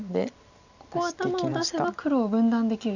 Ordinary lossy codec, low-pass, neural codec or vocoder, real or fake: none; 7.2 kHz; none; real